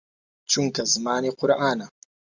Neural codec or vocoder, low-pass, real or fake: none; 7.2 kHz; real